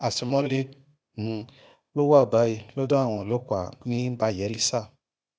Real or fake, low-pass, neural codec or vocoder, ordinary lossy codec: fake; none; codec, 16 kHz, 0.8 kbps, ZipCodec; none